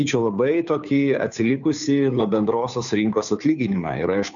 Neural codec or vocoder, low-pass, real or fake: codec, 16 kHz, 2 kbps, FunCodec, trained on Chinese and English, 25 frames a second; 7.2 kHz; fake